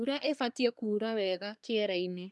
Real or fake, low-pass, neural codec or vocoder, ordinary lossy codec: fake; none; codec, 24 kHz, 1 kbps, SNAC; none